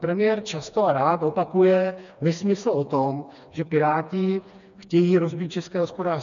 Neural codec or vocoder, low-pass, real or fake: codec, 16 kHz, 2 kbps, FreqCodec, smaller model; 7.2 kHz; fake